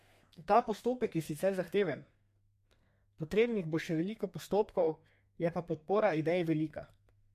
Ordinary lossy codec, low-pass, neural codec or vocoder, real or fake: MP3, 64 kbps; 14.4 kHz; codec, 32 kHz, 1.9 kbps, SNAC; fake